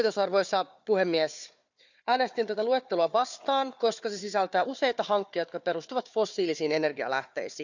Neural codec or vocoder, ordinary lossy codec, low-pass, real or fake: codec, 16 kHz, 4 kbps, FunCodec, trained on Chinese and English, 50 frames a second; none; 7.2 kHz; fake